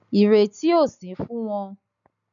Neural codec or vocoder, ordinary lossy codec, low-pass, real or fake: none; none; 7.2 kHz; real